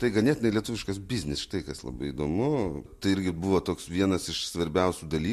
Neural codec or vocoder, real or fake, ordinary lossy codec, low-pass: none; real; MP3, 64 kbps; 14.4 kHz